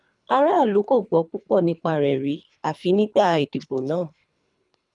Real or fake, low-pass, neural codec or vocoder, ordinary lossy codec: fake; 10.8 kHz; codec, 24 kHz, 3 kbps, HILCodec; none